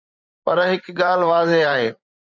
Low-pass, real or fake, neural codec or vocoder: 7.2 kHz; fake; vocoder, 44.1 kHz, 128 mel bands every 512 samples, BigVGAN v2